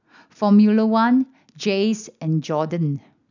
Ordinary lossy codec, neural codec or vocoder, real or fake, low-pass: none; none; real; 7.2 kHz